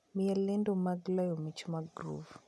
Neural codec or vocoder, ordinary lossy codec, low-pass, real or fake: none; none; none; real